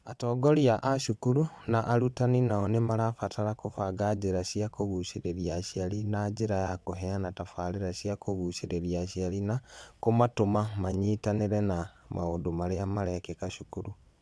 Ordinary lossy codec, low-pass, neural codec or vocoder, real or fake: none; none; vocoder, 22.05 kHz, 80 mel bands, WaveNeXt; fake